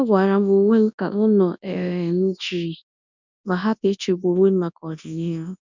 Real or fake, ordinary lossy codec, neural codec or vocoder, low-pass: fake; none; codec, 24 kHz, 0.9 kbps, WavTokenizer, large speech release; 7.2 kHz